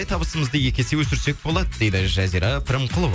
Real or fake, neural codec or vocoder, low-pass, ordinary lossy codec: real; none; none; none